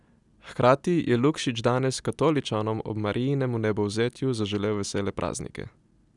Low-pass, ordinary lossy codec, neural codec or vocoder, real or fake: 10.8 kHz; none; none; real